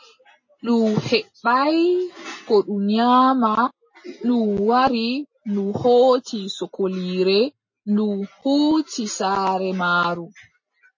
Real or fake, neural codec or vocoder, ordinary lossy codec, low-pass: real; none; MP3, 32 kbps; 7.2 kHz